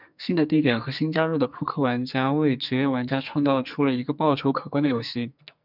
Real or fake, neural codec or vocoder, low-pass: fake; codec, 44.1 kHz, 2.6 kbps, SNAC; 5.4 kHz